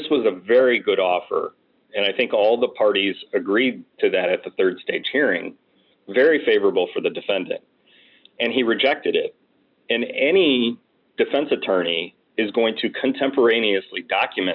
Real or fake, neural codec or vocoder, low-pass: real; none; 5.4 kHz